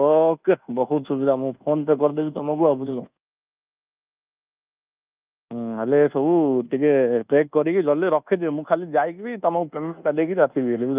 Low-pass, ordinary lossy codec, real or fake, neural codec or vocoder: 3.6 kHz; Opus, 24 kbps; fake; codec, 24 kHz, 1.2 kbps, DualCodec